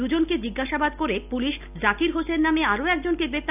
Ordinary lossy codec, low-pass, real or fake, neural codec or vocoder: none; 3.6 kHz; real; none